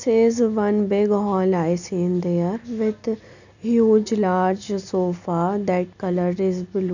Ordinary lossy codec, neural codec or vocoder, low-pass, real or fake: none; none; 7.2 kHz; real